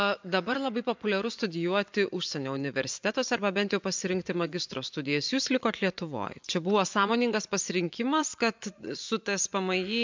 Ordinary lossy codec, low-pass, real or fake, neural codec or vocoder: MP3, 64 kbps; 7.2 kHz; fake; vocoder, 24 kHz, 100 mel bands, Vocos